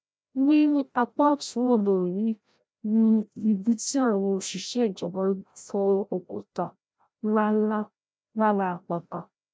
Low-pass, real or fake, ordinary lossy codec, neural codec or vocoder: none; fake; none; codec, 16 kHz, 0.5 kbps, FreqCodec, larger model